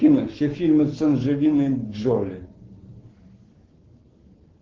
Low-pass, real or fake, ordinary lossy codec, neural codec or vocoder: 7.2 kHz; fake; Opus, 16 kbps; codec, 44.1 kHz, 7.8 kbps, Pupu-Codec